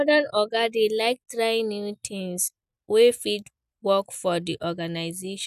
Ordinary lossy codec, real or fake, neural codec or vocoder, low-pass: none; real; none; 14.4 kHz